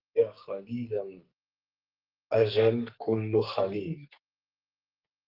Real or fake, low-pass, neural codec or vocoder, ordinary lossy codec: fake; 5.4 kHz; codec, 44.1 kHz, 2.6 kbps, SNAC; Opus, 32 kbps